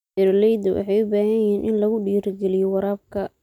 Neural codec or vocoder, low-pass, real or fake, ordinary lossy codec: none; 19.8 kHz; real; none